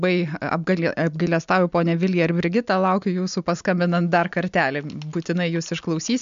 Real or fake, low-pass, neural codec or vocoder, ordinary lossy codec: real; 7.2 kHz; none; MP3, 64 kbps